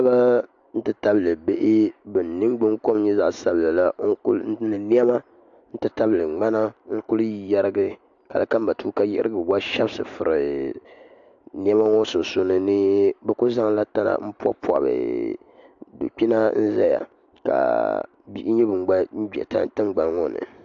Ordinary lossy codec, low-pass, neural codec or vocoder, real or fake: AAC, 64 kbps; 7.2 kHz; none; real